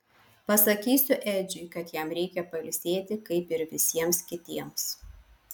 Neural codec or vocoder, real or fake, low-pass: none; real; 19.8 kHz